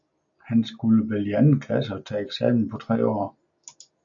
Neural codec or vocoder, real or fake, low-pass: none; real; 7.2 kHz